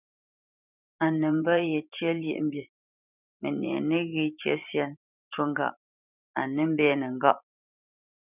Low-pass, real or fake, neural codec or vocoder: 3.6 kHz; fake; vocoder, 44.1 kHz, 128 mel bands every 512 samples, BigVGAN v2